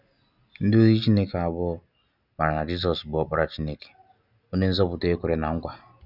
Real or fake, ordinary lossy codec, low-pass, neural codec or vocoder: real; none; 5.4 kHz; none